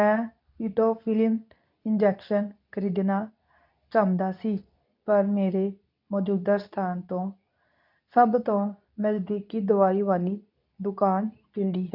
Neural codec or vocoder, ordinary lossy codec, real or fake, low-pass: codec, 24 kHz, 0.9 kbps, WavTokenizer, medium speech release version 2; none; fake; 5.4 kHz